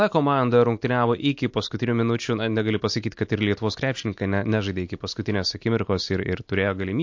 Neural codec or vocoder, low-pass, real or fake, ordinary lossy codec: none; 7.2 kHz; real; MP3, 48 kbps